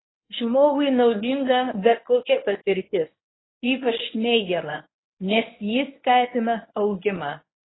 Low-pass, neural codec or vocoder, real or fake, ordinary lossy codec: 7.2 kHz; codec, 24 kHz, 0.9 kbps, WavTokenizer, medium speech release version 1; fake; AAC, 16 kbps